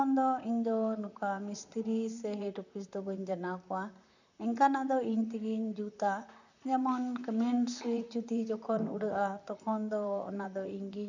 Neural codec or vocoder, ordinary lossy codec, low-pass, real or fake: vocoder, 44.1 kHz, 128 mel bands, Pupu-Vocoder; none; 7.2 kHz; fake